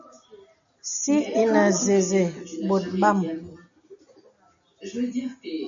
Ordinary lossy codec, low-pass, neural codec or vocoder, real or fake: AAC, 64 kbps; 7.2 kHz; none; real